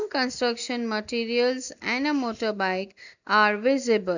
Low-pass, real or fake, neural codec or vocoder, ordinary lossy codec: 7.2 kHz; real; none; none